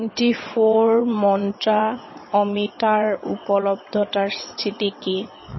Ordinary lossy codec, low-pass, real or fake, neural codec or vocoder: MP3, 24 kbps; 7.2 kHz; fake; vocoder, 22.05 kHz, 80 mel bands, WaveNeXt